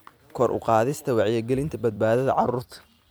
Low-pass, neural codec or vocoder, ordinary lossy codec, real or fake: none; vocoder, 44.1 kHz, 128 mel bands every 256 samples, BigVGAN v2; none; fake